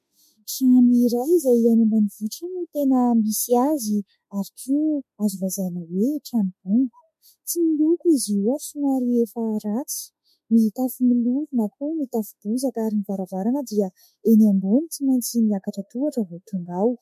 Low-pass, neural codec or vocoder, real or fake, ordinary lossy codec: 14.4 kHz; autoencoder, 48 kHz, 32 numbers a frame, DAC-VAE, trained on Japanese speech; fake; MP3, 64 kbps